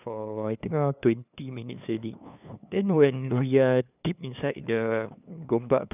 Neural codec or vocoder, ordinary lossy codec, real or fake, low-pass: codec, 16 kHz, 2 kbps, FunCodec, trained on LibriTTS, 25 frames a second; none; fake; 3.6 kHz